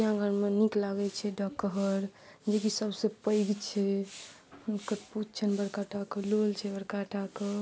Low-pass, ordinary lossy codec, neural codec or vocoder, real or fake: none; none; none; real